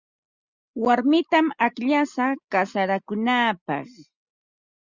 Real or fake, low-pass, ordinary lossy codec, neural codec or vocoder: real; 7.2 kHz; Opus, 64 kbps; none